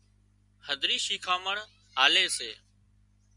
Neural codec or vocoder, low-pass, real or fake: none; 10.8 kHz; real